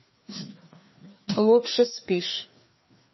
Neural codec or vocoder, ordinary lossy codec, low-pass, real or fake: codec, 16 kHz, 2 kbps, FreqCodec, larger model; MP3, 24 kbps; 7.2 kHz; fake